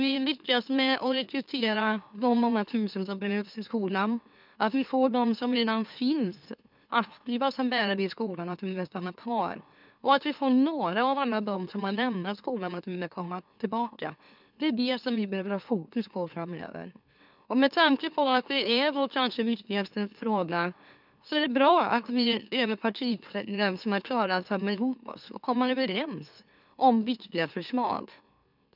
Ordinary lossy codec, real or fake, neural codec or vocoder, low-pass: none; fake; autoencoder, 44.1 kHz, a latent of 192 numbers a frame, MeloTTS; 5.4 kHz